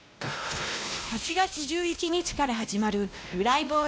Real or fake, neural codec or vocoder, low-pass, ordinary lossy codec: fake; codec, 16 kHz, 1 kbps, X-Codec, WavLM features, trained on Multilingual LibriSpeech; none; none